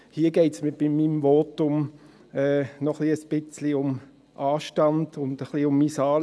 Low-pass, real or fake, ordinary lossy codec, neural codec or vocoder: none; real; none; none